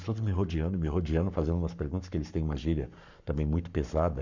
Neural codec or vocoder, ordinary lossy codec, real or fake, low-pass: codec, 44.1 kHz, 7.8 kbps, Pupu-Codec; none; fake; 7.2 kHz